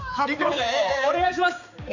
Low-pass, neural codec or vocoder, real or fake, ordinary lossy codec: 7.2 kHz; codec, 16 kHz, 4 kbps, X-Codec, HuBERT features, trained on balanced general audio; fake; none